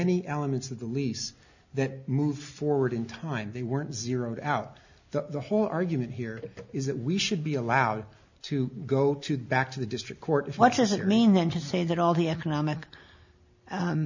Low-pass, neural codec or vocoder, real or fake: 7.2 kHz; none; real